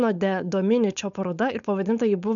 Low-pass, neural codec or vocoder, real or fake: 7.2 kHz; codec, 16 kHz, 4.8 kbps, FACodec; fake